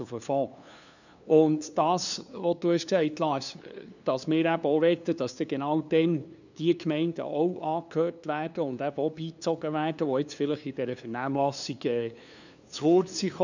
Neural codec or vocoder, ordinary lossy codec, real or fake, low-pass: codec, 16 kHz, 2 kbps, FunCodec, trained on LibriTTS, 25 frames a second; none; fake; 7.2 kHz